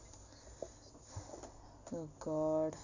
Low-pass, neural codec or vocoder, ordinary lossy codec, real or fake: 7.2 kHz; none; AAC, 48 kbps; real